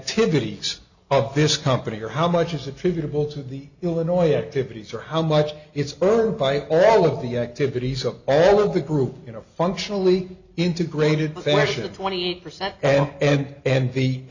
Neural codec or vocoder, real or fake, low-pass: none; real; 7.2 kHz